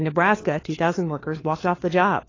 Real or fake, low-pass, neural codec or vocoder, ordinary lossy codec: fake; 7.2 kHz; codec, 16 kHz, 4 kbps, FunCodec, trained on LibriTTS, 50 frames a second; AAC, 32 kbps